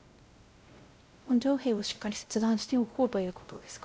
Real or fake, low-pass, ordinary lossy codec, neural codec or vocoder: fake; none; none; codec, 16 kHz, 0.5 kbps, X-Codec, WavLM features, trained on Multilingual LibriSpeech